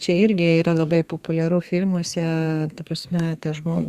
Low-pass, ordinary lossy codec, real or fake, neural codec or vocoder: 14.4 kHz; Opus, 64 kbps; fake; codec, 32 kHz, 1.9 kbps, SNAC